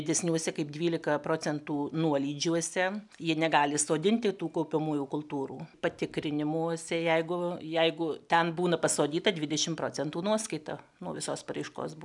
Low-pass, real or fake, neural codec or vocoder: 10.8 kHz; real; none